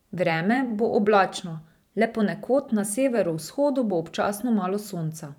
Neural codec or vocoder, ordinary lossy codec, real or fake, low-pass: vocoder, 44.1 kHz, 128 mel bands every 512 samples, BigVGAN v2; none; fake; 19.8 kHz